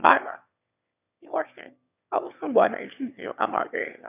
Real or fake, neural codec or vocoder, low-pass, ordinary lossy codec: fake; autoencoder, 22.05 kHz, a latent of 192 numbers a frame, VITS, trained on one speaker; 3.6 kHz; none